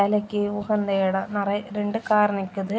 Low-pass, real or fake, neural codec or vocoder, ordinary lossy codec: none; real; none; none